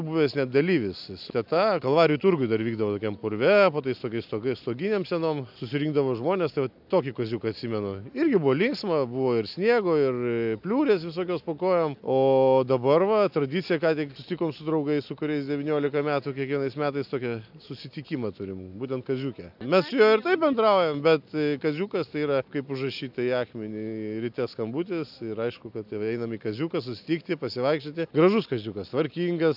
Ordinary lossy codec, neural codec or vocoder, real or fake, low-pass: AAC, 48 kbps; none; real; 5.4 kHz